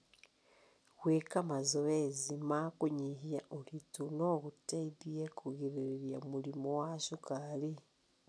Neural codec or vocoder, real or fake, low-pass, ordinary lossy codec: none; real; none; none